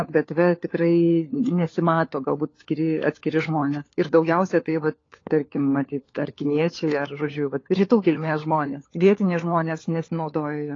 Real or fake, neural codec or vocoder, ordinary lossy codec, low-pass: fake; codec, 16 kHz, 4 kbps, FunCodec, trained on LibriTTS, 50 frames a second; AAC, 32 kbps; 7.2 kHz